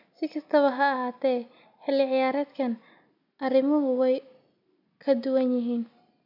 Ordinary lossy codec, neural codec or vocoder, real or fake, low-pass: MP3, 48 kbps; none; real; 5.4 kHz